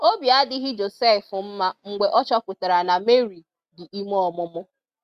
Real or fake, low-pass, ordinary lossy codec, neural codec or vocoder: real; 14.4 kHz; Opus, 64 kbps; none